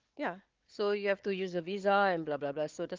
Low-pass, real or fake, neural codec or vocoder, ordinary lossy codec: 7.2 kHz; fake; codec, 16 kHz, 4 kbps, FunCodec, trained on Chinese and English, 50 frames a second; Opus, 32 kbps